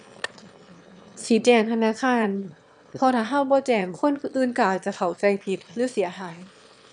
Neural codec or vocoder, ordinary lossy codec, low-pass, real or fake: autoencoder, 22.05 kHz, a latent of 192 numbers a frame, VITS, trained on one speaker; none; 9.9 kHz; fake